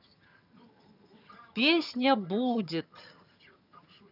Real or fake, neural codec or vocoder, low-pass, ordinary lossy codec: fake; vocoder, 22.05 kHz, 80 mel bands, HiFi-GAN; 5.4 kHz; none